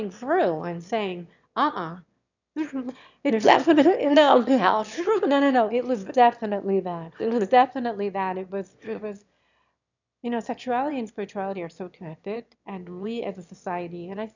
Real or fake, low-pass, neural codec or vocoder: fake; 7.2 kHz; autoencoder, 22.05 kHz, a latent of 192 numbers a frame, VITS, trained on one speaker